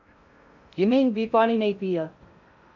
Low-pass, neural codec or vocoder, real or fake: 7.2 kHz; codec, 16 kHz in and 24 kHz out, 0.6 kbps, FocalCodec, streaming, 2048 codes; fake